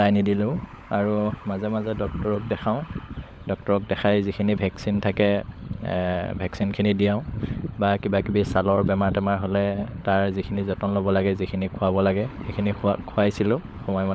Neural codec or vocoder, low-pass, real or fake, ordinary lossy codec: codec, 16 kHz, 16 kbps, FunCodec, trained on LibriTTS, 50 frames a second; none; fake; none